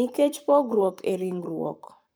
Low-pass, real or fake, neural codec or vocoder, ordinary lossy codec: none; fake; codec, 44.1 kHz, 7.8 kbps, Pupu-Codec; none